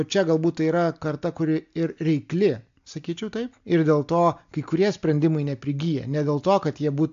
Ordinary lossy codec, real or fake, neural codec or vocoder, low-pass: AAC, 64 kbps; real; none; 7.2 kHz